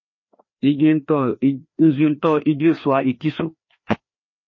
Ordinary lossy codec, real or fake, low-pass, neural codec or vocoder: MP3, 32 kbps; fake; 7.2 kHz; codec, 16 kHz, 2 kbps, FreqCodec, larger model